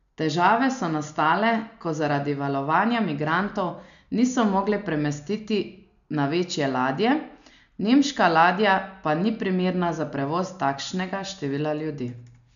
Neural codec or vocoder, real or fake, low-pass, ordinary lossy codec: none; real; 7.2 kHz; none